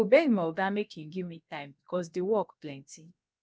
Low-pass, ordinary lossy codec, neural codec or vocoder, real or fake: none; none; codec, 16 kHz, about 1 kbps, DyCAST, with the encoder's durations; fake